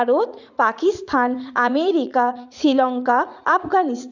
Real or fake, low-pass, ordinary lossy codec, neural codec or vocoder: fake; 7.2 kHz; none; vocoder, 44.1 kHz, 80 mel bands, Vocos